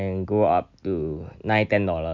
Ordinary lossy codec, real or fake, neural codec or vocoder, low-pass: none; real; none; 7.2 kHz